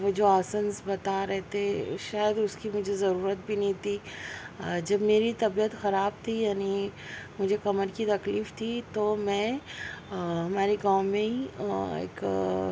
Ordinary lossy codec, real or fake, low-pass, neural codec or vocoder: none; real; none; none